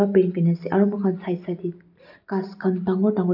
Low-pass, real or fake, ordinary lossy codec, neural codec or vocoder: 5.4 kHz; real; none; none